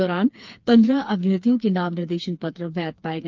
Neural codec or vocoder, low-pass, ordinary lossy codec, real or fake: codec, 16 kHz, 4 kbps, FreqCodec, smaller model; 7.2 kHz; Opus, 24 kbps; fake